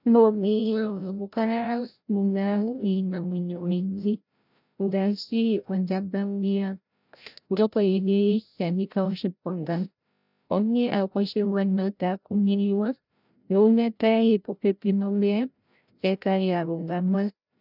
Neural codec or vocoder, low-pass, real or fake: codec, 16 kHz, 0.5 kbps, FreqCodec, larger model; 5.4 kHz; fake